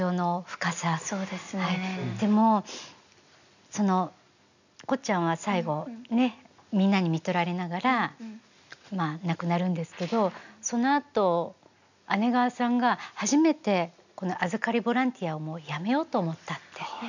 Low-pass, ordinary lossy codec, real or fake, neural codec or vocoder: 7.2 kHz; none; real; none